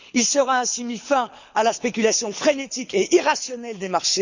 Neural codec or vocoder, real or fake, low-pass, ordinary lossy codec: codec, 24 kHz, 6 kbps, HILCodec; fake; 7.2 kHz; Opus, 64 kbps